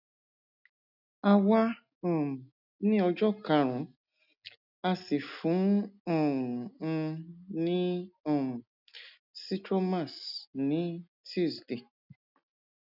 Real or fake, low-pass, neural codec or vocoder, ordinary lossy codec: real; 5.4 kHz; none; none